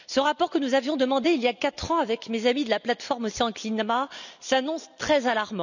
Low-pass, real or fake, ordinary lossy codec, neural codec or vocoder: 7.2 kHz; real; none; none